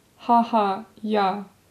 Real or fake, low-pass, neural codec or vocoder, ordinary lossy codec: real; 14.4 kHz; none; none